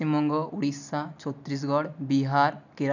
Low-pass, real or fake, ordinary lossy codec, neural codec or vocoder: 7.2 kHz; real; none; none